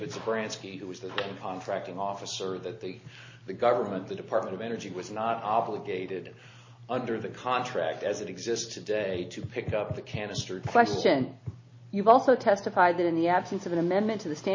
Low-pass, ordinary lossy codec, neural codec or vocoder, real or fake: 7.2 kHz; MP3, 32 kbps; none; real